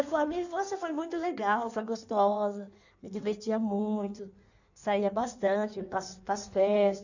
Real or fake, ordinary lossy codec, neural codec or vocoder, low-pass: fake; none; codec, 16 kHz in and 24 kHz out, 1.1 kbps, FireRedTTS-2 codec; 7.2 kHz